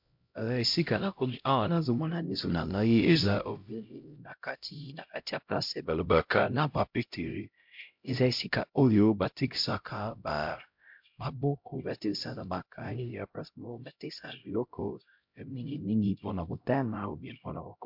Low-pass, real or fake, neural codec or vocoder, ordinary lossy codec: 5.4 kHz; fake; codec, 16 kHz, 0.5 kbps, X-Codec, HuBERT features, trained on LibriSpeech; MP3, 48 kbps